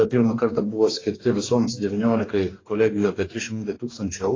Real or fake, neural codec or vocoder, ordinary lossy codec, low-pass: fake; codec, 44.1 kHz, 2.6 kbps, DAC; AAC, 32 kbps; 7.2 kHz